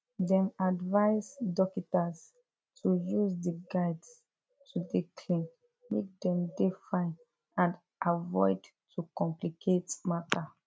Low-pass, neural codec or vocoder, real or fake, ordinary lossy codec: none; none; real; none